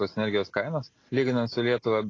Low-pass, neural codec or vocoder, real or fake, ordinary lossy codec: 7.2 kHz; none; real; AAC, 32 kbps